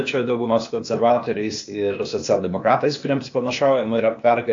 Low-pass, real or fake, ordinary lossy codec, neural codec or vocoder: 7.2 kHz; fake; AAC, 48 kbps; codec, 16 kHz, 0.8 kbps, ZipCodec